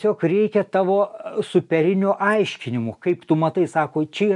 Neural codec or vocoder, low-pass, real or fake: none; 10.8 kHz; real